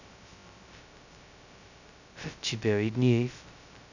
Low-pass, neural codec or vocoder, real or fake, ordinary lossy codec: 7.2 kHz; codec, 16 kHz, 0.2 kbps, FocalCodec; fake; none